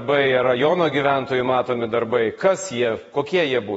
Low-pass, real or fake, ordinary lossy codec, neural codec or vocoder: 19.8 kHz; fake; AAC, 24 kbps; vocoder, 48 kHz, 128 mel bands, Vocos